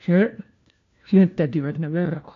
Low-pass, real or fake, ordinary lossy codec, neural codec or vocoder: 7.2 kHz; fake; AAC, 48 kbps; codec, 16 kHz, 1 kbps, FunCodec, trained on LibriTTS, 50 frames a second